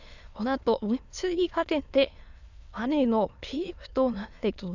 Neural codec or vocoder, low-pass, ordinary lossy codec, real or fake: autoencoder, 22.05 kHz, a latent of 192 numbers a frame, VITS, trained on many speakers; 7.2 kHz; none; fake